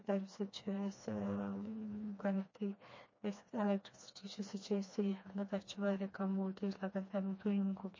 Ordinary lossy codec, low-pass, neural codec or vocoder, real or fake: MP3, 32 kbps; 7.2 kHz; codec, 16 kHz, 2 kbps, FreqCodec, smaller model; fake